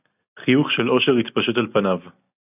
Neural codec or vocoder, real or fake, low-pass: none; real; 3.6 kHz